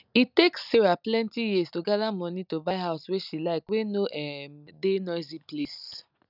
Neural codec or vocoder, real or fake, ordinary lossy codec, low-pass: none; real; none; 5.4 kHz